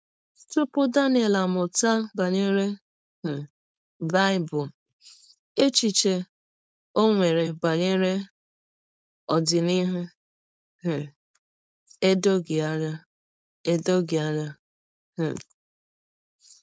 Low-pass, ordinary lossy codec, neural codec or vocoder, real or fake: none; none; codec, 16 kHz, 4.8 kbps, FACodec; fake